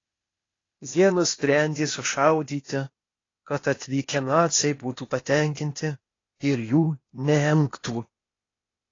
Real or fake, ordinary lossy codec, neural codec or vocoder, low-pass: fake; AAC, 32 kbps; codec, 16 kHz, 0.8 kbps, ZipCodec; 7.2 kHz